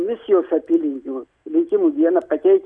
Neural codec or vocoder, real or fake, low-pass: none; real; 9.9 kHz